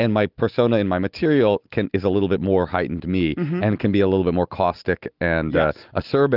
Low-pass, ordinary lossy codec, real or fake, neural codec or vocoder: 5.4 kHz; Opus, 32 kbps; real; none